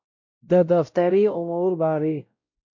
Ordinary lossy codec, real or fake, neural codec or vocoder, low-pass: MP3, 48 kbps; fake; codec, 16 kHz, 0.5 kbps, X-Codec, WavLM features, trained on Multilingual LibriSpeech; 7.2 kHz